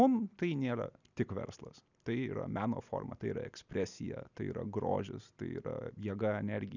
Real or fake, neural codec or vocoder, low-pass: real; none; 7.2 kHz